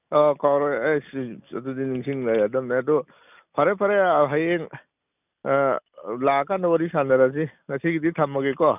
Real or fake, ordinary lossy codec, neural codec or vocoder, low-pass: real; none; none; 3.6 kHz